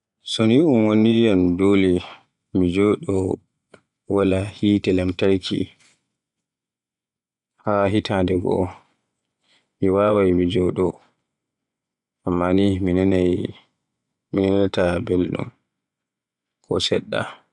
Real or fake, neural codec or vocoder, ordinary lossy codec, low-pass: fake; vocoder, 24 kHz, 100 mel bands, Vocos; none; 10.8 kHz